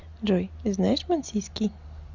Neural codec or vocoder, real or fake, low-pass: none; real; 7.2 kHz